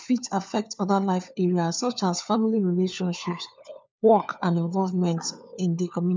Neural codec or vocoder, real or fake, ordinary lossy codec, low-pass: codec, 16 kHz, 4 kbps, FunCodec, trained on LibriTTS, 50 frames a second; fake; none; none